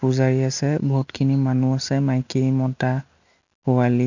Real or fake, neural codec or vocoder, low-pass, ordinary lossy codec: real; none; 7.2 kHz; none